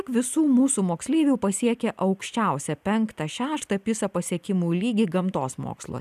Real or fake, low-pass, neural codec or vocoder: real; 14.4 kHz; none